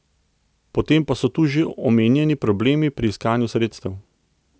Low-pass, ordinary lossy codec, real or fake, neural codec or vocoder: none; none; real; none